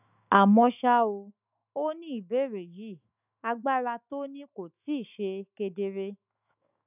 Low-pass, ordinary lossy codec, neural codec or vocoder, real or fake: 3.6 kHz; none; autoencoder, 48 kHz, 128 numbers a frame, DAC-VAE, trained on Japanese speech; fake